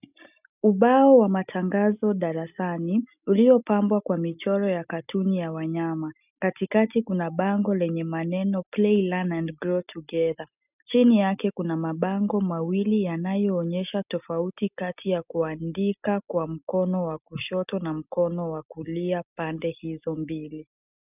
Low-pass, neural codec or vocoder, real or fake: 3.6 kHz; none; real